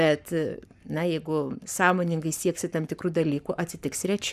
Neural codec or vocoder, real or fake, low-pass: codec, 44.1 kHz, 7.8 kbps, Pupu-Codec; fake; 14.4 kHz